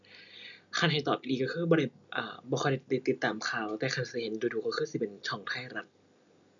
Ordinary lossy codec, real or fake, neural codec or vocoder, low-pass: none; real; none; 7.2 kHz